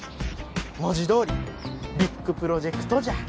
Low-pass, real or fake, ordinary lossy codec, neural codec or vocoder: none; real; none; none